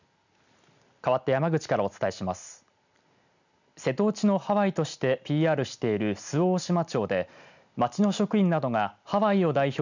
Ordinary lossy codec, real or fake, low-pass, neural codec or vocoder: none; real; 7.2 kHz; none